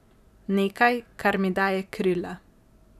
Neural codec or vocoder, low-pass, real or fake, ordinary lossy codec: vocoder, 44.1 kHz, 128 mel bands every 512 samples, BigVGAN v2; 14.4 kHz; fake; none